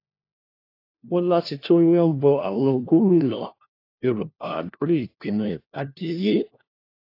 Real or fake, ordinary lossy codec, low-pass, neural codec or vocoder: fake; AAC, 32 kbps; 5.4 kHz; codec, 16 kHz, 1 kbps, FunCodec, trained on LibriTTS, 50 frames a second